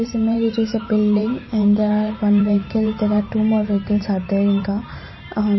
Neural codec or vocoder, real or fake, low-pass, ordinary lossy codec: vocoder, 44.1 kHz, 128 mel bands every 512 samples, BigVGAN v2; fake; 7.2 kHz; MP3, 24 kbps